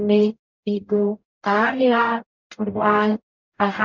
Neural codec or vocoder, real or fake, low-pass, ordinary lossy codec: codec, 44.1 kHz, 0.9 kbps, DAC; fake; 7.2 kHz; none